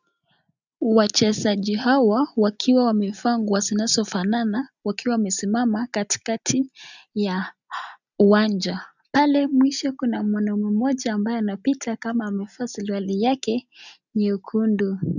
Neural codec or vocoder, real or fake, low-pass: none; real; 7.2 kHz